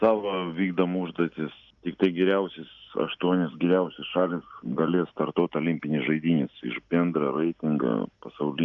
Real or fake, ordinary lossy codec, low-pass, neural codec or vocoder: real; Opus, 64 kbps; 7.2 kHz; none